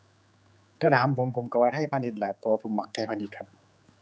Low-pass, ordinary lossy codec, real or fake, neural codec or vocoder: none; none; fake; codec, 16 kHz, 4 kbps, X-Codec, HuBERT features, trained on general audio